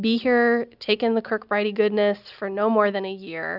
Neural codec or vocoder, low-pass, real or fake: none; 5.4 kHz; real